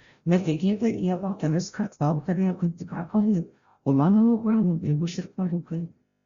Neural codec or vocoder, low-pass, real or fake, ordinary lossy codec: codec, 16 kHz, 0.5 kbps, FreqCodec, larger model; 7.2 kHz; fake; Opus, 64 kbps